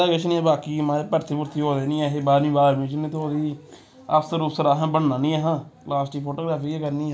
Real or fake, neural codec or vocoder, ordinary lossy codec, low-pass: real; none; none; none